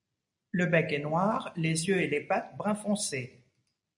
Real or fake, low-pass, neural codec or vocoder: real; 10.8 kHz; none